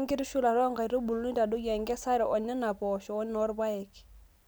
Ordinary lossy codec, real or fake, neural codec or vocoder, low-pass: none; real; none; none